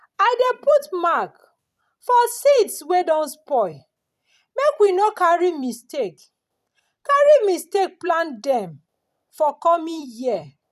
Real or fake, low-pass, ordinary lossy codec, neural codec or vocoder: fake; 14.4 kHz; none; vocoder, 44.1 kHz, 128 mel bands every 256 samples, BigVGAN v2